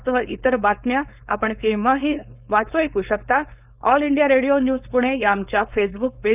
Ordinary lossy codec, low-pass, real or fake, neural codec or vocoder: none; 3.6 kHz; fake; codec, 16 kHz, 4.8 kbps, FACodec